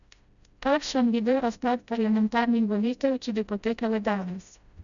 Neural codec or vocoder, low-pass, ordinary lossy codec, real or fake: codec, 16 kHz, 0.5 kbps, FreqCodec, smaller model; 7.2 kHz; none; fake